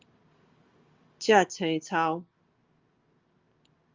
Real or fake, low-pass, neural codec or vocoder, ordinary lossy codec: real; 7.2 kHz; none; Opus, 32 kbps